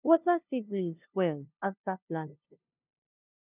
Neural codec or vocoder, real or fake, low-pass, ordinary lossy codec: codec, 16 kHz, 0.5 kbps, FunCodec, trained on LibriTTS, 25 frames a second; fake; 3.6 kHz; none